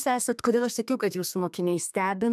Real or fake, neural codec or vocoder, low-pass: fake; codec, 32 kHz, 1.9 kbps, SNAC; 14.4 kHz